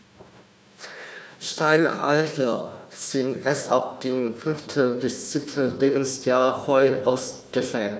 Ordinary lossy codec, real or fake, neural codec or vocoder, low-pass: none; fake; codec, 16 kHz, 1 kbps, FunCodec, trained on Chinese and English, 50 frames a second; none